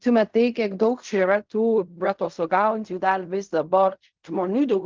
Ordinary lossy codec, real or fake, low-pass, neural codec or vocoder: Opus, 32 kbps; fake; 7.2 kHz; codec, 16 kHz in and 24 kHz out, 0.4 kbps, LongCat-Audio-Codec, fine tuned four codebook decoder